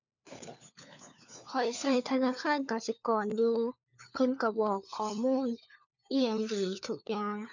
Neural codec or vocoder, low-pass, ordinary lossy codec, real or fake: codec, 16 kHz, 4 kbps, FunCodec, trained on LibriTTS, 50 frames a second; 7.2 kHz; none; fake